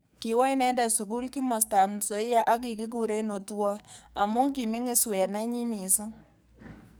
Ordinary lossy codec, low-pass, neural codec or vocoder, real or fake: none; none; codec, 44.1 kHz, 2.6 kbps, SNAC; fake